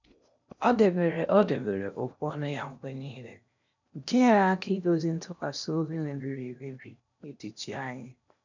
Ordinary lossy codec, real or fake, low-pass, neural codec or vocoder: none; fake; 7.2 kHz; codec, 16 kHz in and 24 kHz out, 0.8 kbps, FocalCodec, streaming, 65536 codes